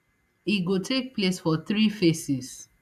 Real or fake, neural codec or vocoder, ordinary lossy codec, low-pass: real; none; AAC, 96 kbps; 14.4 kHz